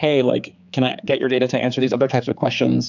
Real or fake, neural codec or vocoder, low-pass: fake; codec, 16 kHz, 4 kbps, X-Codec, HuBERT features, trained on general audio; 7.2 kHz